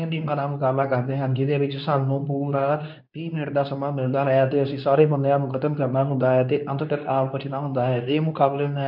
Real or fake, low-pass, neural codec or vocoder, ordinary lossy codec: fake; 5.4 kHz; codec, 24 kHz, 0.9 kbps, WavTokenizer, medium speech release version 2; none